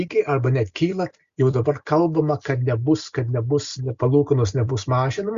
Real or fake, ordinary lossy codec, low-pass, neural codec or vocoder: real; Opus, 64 kbps; 7.2 kHz; none